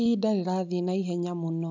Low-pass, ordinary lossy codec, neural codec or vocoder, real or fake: 7.2 kHz; none; none; real